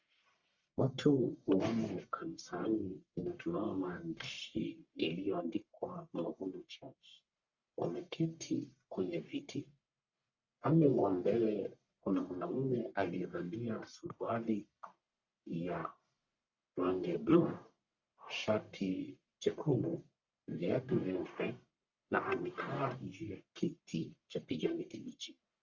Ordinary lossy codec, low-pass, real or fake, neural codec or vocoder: Opus, 64 kbps; 7.2 kHz; fake; codec, 44.1 kHz, 1.7 kbps, Pupu-Codec